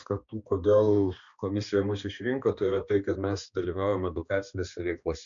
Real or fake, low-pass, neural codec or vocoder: fake; 7.2 kHz; codec, 16 kHz, 2 kbps, X-Codec, HuBERT features, trained on balanced general audio